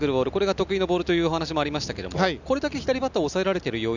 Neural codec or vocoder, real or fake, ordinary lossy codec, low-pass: none; real; none; 7.2 kHz